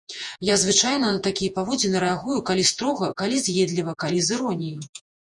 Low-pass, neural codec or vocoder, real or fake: 10.8 kHz; vocoder, 48 kHz, 128 mel bands, Vocos; fake